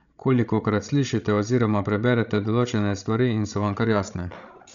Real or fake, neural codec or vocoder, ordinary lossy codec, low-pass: fake; codec, 16 kHz, 16 kbps, FreqCodec, larger model; none; 7.2 kHz